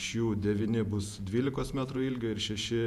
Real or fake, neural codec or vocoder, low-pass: fake; vocoder, 48 kHz, 128 mel bands, Vocos; 14.4 kHz